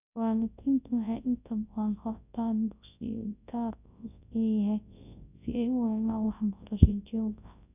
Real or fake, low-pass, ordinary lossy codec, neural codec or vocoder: fake; 3.6 kHz; none; codec, 24 kHz, 0.9 kbps, WavTokenizer, large speech release